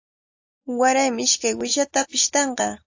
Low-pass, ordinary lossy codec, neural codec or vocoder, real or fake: 7.2 kHz; AAC, 48 kbps; none; real